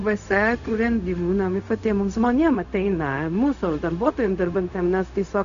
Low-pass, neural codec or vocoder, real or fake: 7.2 kHz; codec, 16 kHz, 0.4 kbps, LongCat-Audio-Codec; fake